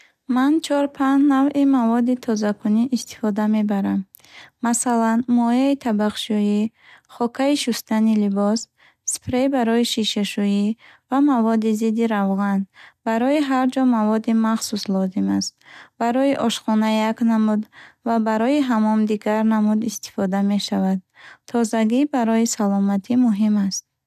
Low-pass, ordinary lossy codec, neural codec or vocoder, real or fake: 14.4 kHz; none; none; real